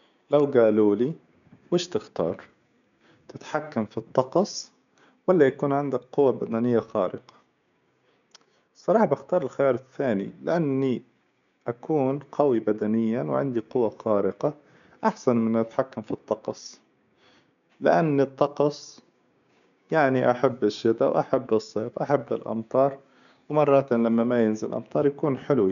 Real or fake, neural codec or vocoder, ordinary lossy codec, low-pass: fake; codec, 16 kHz, 6 kbps, DAC; none; 7.2 kHz